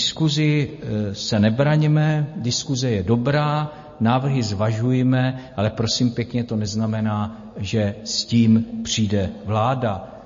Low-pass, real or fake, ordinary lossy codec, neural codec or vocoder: 7.2 kHz; real; MP3, 32 kbps; none